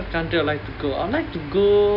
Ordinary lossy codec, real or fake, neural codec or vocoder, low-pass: none; real; none; 5.4 kHz